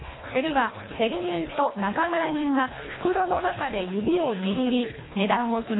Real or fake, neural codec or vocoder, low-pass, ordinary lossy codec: fake; codec, 24 kHz, 1.5 kbps, HILCodec; 7.2 kHz; AAC, 16 kbps